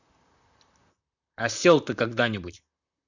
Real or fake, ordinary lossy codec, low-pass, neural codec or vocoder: fake; none; 7.2 kHz; vocoder, 44.1 kHz, 128 mel bands, Pupu-Vocoder